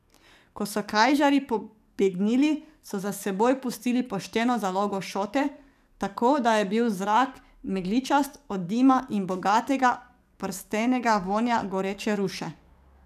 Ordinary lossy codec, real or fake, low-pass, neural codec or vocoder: none; fake; 14.4 kHz; codec, 44.1 kHz, 7.8 kbps, DAC